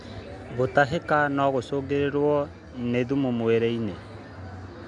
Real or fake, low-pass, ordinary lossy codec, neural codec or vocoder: real; 10.8 kHz; MP3, 96 kbps; none